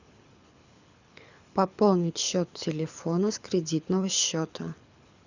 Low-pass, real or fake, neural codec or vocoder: 7.2 kHz; fake; codec, 24 kHz, 6 kbps, HILCodec